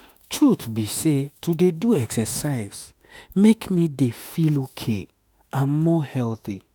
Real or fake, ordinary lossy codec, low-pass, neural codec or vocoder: fake; none; none; autoencoder, 48 kHz, 32 numbers a frame, DAC-VAE, trained on Japanese speech